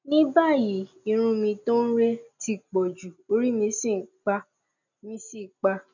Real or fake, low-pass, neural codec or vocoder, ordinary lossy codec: real; 7.2 kHz; none; none